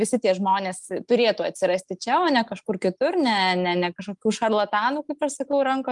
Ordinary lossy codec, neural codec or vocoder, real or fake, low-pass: Opus, 32 kbps; none; real; 10.8 kHz